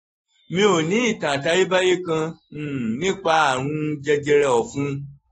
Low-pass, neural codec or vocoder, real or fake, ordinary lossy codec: 19.8 kHz; autoencoder, 48 kHz, 128 numbers a frame, DAC-VAE, trained on Japanese speech; fake; AAC, 24 kbps